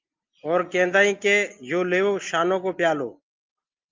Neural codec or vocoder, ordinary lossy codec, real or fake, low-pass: none; Opus, 24 kbps; real; 7.2 kHz